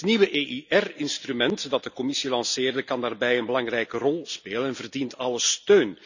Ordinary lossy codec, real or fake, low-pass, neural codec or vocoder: none; real; 7.2 kHz; none